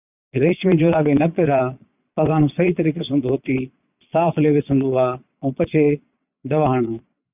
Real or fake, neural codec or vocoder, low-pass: fake; vocoder, 22.05 kHz, 80 mel bands, Vocos; 3.6 kHz